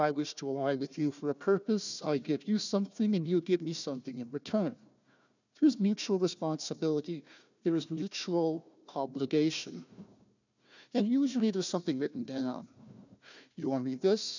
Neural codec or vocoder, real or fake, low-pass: codec, 16 kHz, 1 kbps, FunCodec, trained on Chinese and English, 50 frames a second; fake; 7.2 kHz